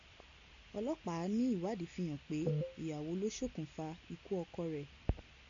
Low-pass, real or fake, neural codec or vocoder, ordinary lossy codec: 7.2 kHz; real; none; AAC, 64 kbps